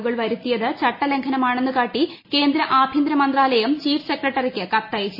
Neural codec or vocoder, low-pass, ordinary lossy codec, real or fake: none; 5.4 kHz; MP3, 24 kbps; real